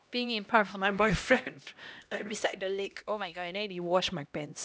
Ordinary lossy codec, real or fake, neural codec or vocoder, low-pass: none; fake; codec, 16 kHz, 1 kbps, X-Codec, HuBERT features, trained on LibriSpeech; none